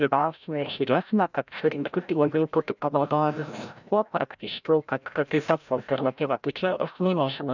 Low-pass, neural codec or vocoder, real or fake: 7.2 kHz; codec, 16 kHz, 0.5 kbps, FreqCodec, larger model; fake